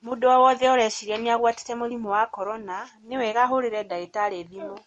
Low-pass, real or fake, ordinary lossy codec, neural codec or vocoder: 19.8 kHz; real; MP3, 48 kbps; none